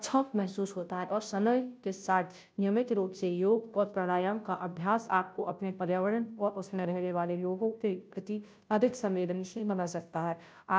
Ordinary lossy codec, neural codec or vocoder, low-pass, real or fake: none; codec, 16 kHz, 0.5 kbps, FunCodec, trained on Chinese and English, 25 frames a second; none; fake